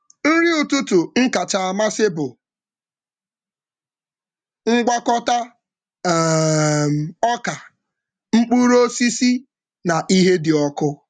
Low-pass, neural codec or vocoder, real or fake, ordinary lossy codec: 9.9 kHz; none; real; none